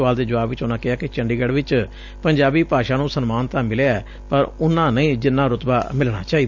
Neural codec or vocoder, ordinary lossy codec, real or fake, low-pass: none; none; real; 7.2 kHz